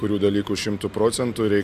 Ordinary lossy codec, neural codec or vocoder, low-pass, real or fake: MP3, 96 kbps; none; 14.4 kHz; real